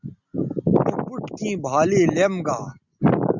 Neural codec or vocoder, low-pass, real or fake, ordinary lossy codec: none; 7.2 kHz; real; Opus, 64 kbps